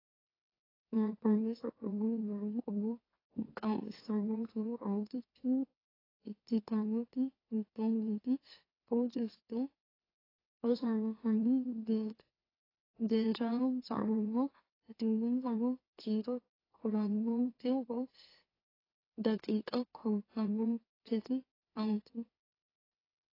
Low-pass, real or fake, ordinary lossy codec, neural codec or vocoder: 5.4 kHz; fake; AAC, 24 kbps; autoencoder, 44.1 kHz, a latent of 192 numbers a frame, MeloTTS